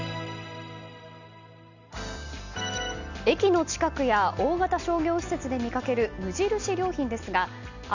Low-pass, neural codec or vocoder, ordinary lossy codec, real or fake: 7.2 kHz; none; none; real